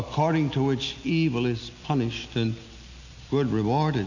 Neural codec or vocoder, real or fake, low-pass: none; real; 7.2 kHz